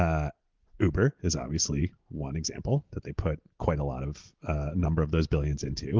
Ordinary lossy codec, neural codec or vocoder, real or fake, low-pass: Opus, 16 kbps; none; real; 7.2 kHz